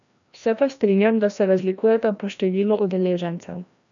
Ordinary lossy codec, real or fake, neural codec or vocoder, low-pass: none; fake; codec, 16 kHz, 1 kbps, FreqCodec, larger model; 7.2 kHz